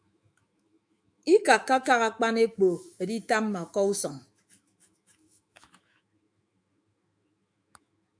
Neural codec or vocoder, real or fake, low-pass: autoencoder, 48 kHz, 128 numbers a frame, DAC-VAE, trained on Japanese speech; fake; 9.9 kHz